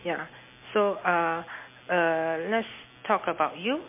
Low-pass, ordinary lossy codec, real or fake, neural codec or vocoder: 3.6 kHz; MP3, 24 kbps; real; none